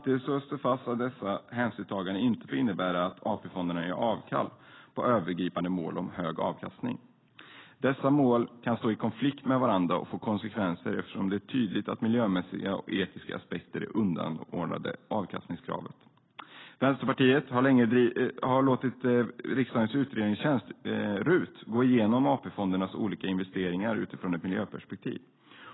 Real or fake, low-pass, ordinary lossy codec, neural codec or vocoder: real; 7.2 kHz; AAC, 16 kbps; none